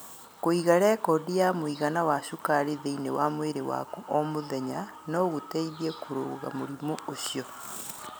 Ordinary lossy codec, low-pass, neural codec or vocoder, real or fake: none; none; none; real